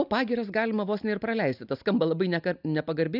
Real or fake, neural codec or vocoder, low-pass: real; none; 5.4 kHz